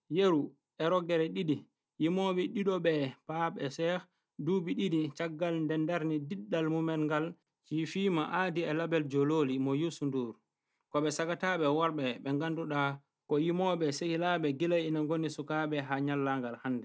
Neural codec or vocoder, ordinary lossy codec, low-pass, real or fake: none; none; none; real